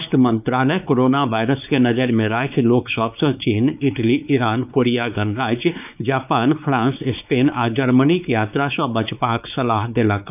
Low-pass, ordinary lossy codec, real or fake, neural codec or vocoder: 3.6 kHz; none; fake; codec, 16 kHz, 4 kbps, X-Codec, WavLM features, trained on Multilingual LibriSpeech